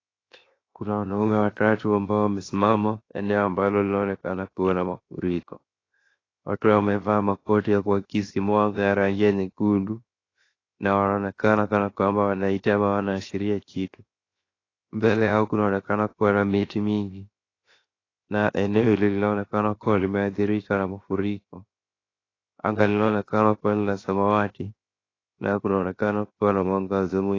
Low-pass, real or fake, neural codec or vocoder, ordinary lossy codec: 7.2 kHz; fake; codec, 16 kHz, 0.7 kbps, FocalCodec; AAC, 32 kbps